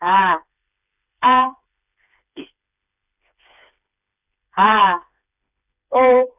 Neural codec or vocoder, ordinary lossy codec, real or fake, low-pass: codec, 16 kHz, 4 kbps, FreqCodec, smaller model; none; fake; 3.6 kHz